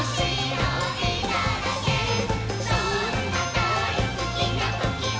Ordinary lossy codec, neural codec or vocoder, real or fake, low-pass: none; none; real; none